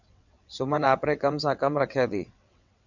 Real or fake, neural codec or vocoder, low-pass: fake; vocoder, 22.05 kHz, 80 mel bands, WaveNeXt; 7.2 kHz